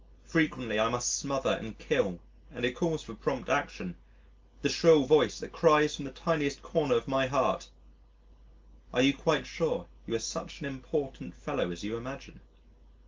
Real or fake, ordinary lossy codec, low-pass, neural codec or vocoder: real; Opus, 32 kbps; 7.2 kHz; none